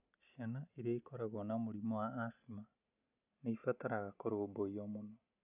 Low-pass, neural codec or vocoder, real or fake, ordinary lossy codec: 3.6 kHz; none; real; none